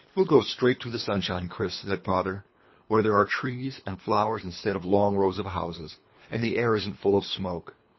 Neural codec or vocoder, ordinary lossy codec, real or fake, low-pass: codec, 24 kHz, 3 kbps, HILCodec; MP3, 24 kbps; fake; 7.2 kHz